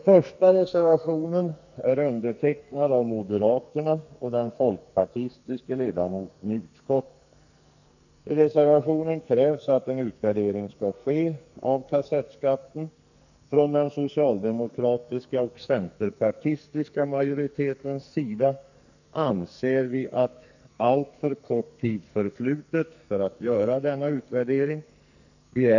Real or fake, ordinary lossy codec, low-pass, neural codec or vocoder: fake; none; 7.2 kHz; codec, 44.1 kHz, 2.6 kbps, SNAC